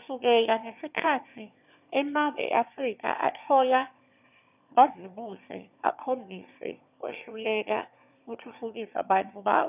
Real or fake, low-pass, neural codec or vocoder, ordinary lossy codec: fake; 3.6 kHz; autoencoder, 22.05 kHz, a latent of 192 numbers a frame, VITS, trained on one speaker; none